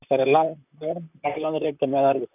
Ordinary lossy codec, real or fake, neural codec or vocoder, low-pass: none; fake; vocoder, 44.1 kHz, 128 mel bands, Pupu-Vocoder; 3.6 kHz